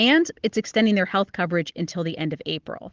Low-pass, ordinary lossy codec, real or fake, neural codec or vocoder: 7.2 kHz; Opus, 16 kbps; real; none